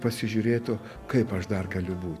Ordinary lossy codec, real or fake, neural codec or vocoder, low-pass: Opus, 64 kbps; real; none; 14.4 kHz